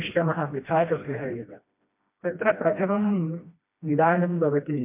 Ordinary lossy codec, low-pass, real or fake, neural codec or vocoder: MP3, 32 kbps; 3.6 kHz; fake; codec, 16 kHz, 1 kbps, FreqCodec, smaller model